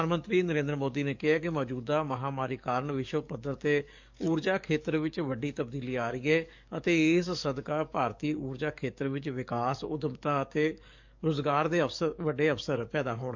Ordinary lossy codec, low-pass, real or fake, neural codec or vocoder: MP3, 64 kbps; 7.2 kHz; fake; codec, 44.1 kHz, 7.8 kbps, DAC